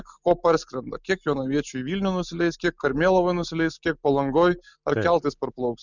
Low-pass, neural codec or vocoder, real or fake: 7.2 kHz; none; real